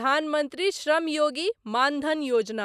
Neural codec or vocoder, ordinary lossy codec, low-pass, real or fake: none; none; 14.4 kHz; real